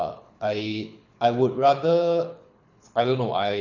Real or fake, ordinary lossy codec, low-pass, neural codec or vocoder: fake; none; 7.2 kHz; codec, 24 kHz, 6 kbps, HILCodec